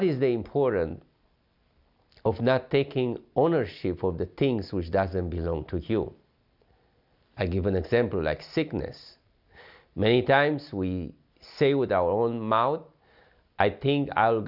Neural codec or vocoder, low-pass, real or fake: none; 5.4 kHz; real